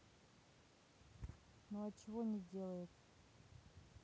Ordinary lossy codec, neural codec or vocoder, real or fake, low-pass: none; none; real; none